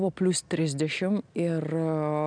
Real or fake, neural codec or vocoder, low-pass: real; none; 9.9 kHz